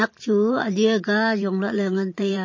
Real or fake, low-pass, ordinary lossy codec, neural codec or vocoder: fake; 7.2 kHz; MP3, 32 kbps; vocoder, 44.1 kHz, 128 mel bands every 512 samples, BigVGAN v2